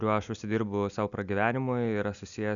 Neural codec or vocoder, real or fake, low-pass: none; real; 7.2 kHz